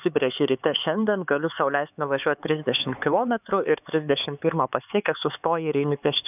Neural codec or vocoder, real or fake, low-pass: codec, 16 kHz, 4 kbps, X-Codec, HuBERT features, trained on LibriSpeech; fake; 3.6 kHz